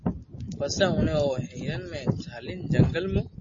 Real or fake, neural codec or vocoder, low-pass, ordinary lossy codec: real; none; 7.2 kHz; MP3, 32 kbps